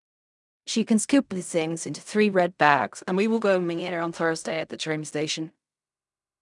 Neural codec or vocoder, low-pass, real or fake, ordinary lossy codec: codec, 16 kHz in and 24 kHz out, 0.4 kbps, LongCat-Audio-Codec, fine tuned four codebook decoder; 10.8 kHz; fake; none